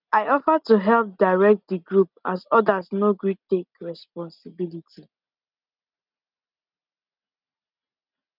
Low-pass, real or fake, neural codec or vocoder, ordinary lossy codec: 5.4 kHz; real; none; none